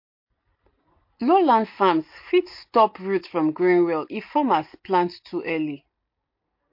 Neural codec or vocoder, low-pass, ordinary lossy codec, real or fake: none; 5.4 kHz; MP3, 32 kbps; real